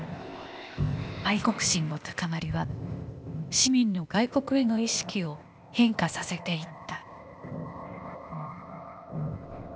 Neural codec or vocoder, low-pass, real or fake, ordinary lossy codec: codec, 16 kHz, 0.8 kbps, ZipCodec; none; fake; none